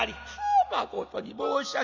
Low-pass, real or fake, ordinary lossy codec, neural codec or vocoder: 7.2 kHz; real; none; none